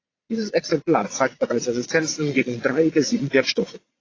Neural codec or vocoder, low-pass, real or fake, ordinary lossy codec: vocoder, 22.05 kHz, 80 mel bands, Vocos; 7.2 kHz; fake; AAC, 32 kbps